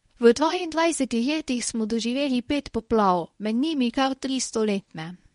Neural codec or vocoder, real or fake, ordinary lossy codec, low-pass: codec, 24 kHz, 0.9 kbps, WavTokenizer, medium speech release version 1; fake; MP3, 48 kbps; 10.8 kHz